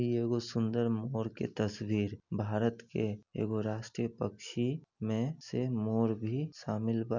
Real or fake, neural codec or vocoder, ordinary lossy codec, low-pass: real; none; none; 7.2 kHz